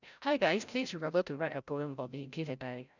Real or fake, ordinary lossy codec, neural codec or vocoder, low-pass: fake; none; codec, 16 kHz, 0.5 kbps, FreqCodec, larger model; 7.2 kHz